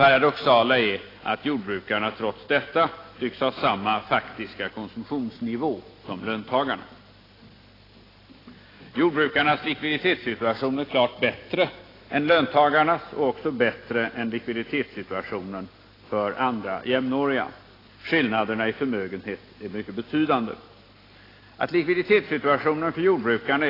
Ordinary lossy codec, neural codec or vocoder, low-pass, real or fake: AAC, 24 kbps; none; 5.4 kHz; real